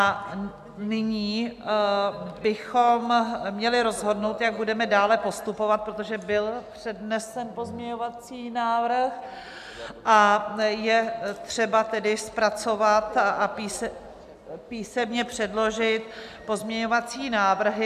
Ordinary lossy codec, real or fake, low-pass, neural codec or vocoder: AAC, 96 kbps; real; 14.4 kHz; none